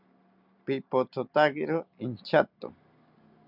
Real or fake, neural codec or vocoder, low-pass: real; none; 5.4 kHz